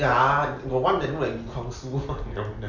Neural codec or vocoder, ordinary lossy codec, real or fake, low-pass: none; none; real; 7.2 kHz